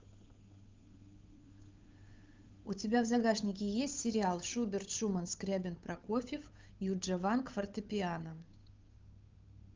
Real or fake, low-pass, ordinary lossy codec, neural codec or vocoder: fake; 7.2 kHz; Opus, 24 kbps; codec, 16 kHz, 8 kbps, FunCodec, trained on Chinese and English, 25 frames a second